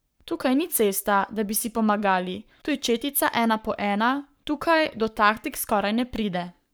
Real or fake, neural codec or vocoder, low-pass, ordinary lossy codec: fake; codec, 44.1 kHz, 7.8 kbps, Pupu-Codec; none; none